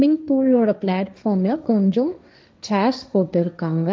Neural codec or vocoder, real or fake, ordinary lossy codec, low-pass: codec, 16 kHz, 1.1 kbps, Voila-Tokenizer; fake; none; none